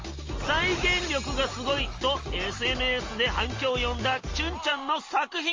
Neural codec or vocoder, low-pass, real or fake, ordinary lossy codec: none; 7.2 kHz; real; Opus, 32 kbps